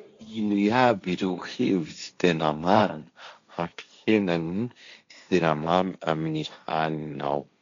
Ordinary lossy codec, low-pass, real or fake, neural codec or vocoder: MP3, 64 kbps; 7.2 kHz; fake; codec, 16 kHz, 1.1 kbps, Voila-Tokenizer